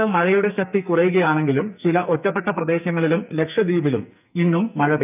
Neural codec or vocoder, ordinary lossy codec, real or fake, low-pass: codec, 44.1 kHz, 2.6 kbps, SNAC; none; fake; 3.6 kHz